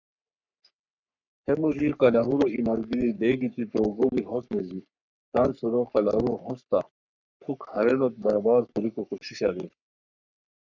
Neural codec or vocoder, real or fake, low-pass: codec, 44.1 kHz, 3.4 kbps, Pupu-Codec; fake; 7.2 kHz